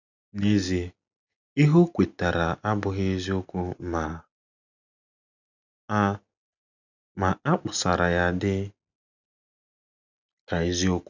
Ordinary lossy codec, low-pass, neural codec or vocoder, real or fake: none; 7.2 kHz; none; real